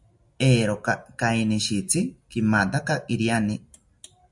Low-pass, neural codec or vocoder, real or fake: 10.8 kHz; none; real